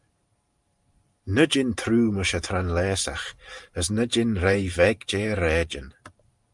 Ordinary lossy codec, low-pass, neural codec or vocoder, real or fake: Opus, 32 kbps; 10.8 kHz; vocoder, 44.1 kHz, 128 mel bands every 512 samples, BigVGAN v2; fake